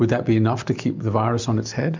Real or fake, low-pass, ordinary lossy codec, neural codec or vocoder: real; 7.2 kHz; MP3, 64 kbps; none